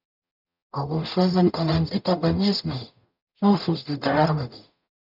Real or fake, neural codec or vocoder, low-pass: fake; codec, 44.1 kHz, 0.9 kbps, DAC; 5.4 kHz